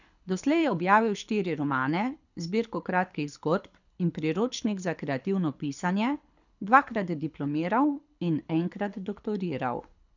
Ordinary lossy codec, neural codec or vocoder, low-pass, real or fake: none; codec, 24 kHz, 6 kbps, HILCodec; 7.2 kHz; fake